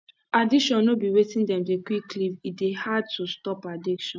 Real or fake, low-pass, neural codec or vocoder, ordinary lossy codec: real; none; none; none